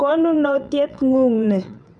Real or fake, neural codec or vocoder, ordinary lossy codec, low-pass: fake; vocoder, 22.05 kHz, 80 mel bands, WaveNeXt; none; 9.9 kHz